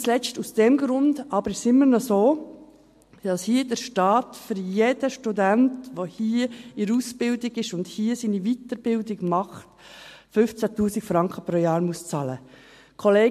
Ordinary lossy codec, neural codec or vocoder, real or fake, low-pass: MP3, 64 kbps; none; real; 14.4 kHz